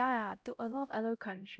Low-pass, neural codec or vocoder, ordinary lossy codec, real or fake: none; codec, 16 kHz, 0.5 kbps, X-Codec, HuBERT features, trained on LibriSpeech; none; fake